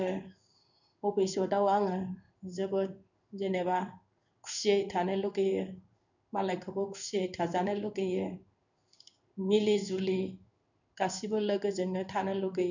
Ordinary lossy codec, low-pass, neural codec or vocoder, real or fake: none; 7.2 kHz; codec, 16 kHz in and 24 kHz out, 1 kbps, XY-Tokenizer; fake